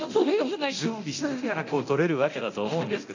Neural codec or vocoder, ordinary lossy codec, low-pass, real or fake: codec, 24 kHz, 0.9 kbps, DualCodec; AAC, 48 kbps; 7.2 kHz; fake